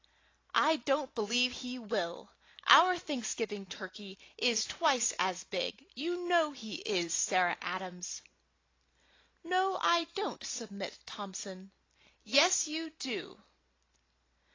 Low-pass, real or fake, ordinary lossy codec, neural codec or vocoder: 7.2 kHz; real; AAC, 32 kbps; none